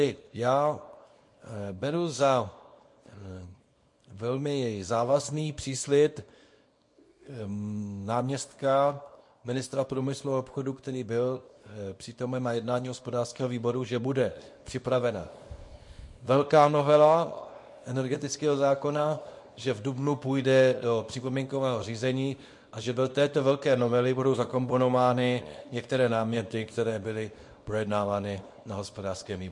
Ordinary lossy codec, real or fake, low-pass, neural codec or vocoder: MP3, 48 kbps; fake; 10.8 kHz; codec, 24 kHz, 0.9 kbps, WavTokenizer, small release